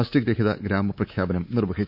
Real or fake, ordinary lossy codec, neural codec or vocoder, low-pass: fake; none; codec, 24 kHz, 3.1 kbps, DualCodec; 5.4 kHz